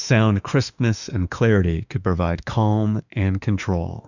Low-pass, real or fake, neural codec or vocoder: 7.2 kHz; fake; autoencoder, 48 kHz, 32 numbers a frame, DAC-VAE, trained on Japanese speech